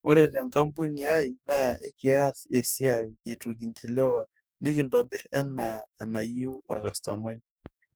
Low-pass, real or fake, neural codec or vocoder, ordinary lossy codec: none; fake; codec, 44.1 kHz, 2.6 kbps, DAC; none